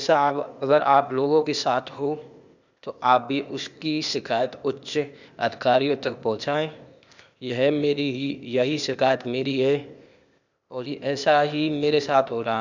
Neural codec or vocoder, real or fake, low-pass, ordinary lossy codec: codec, 16 kHz, 0.8 kbps, ZipCodec; fake; 7.2 kHz; none